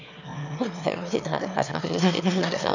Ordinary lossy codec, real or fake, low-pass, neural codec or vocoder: none; fake; 7.2 kHz; autoencoder, 22.05 kHz, a latent of 192 numbers a frame, VITS, trained on one speaker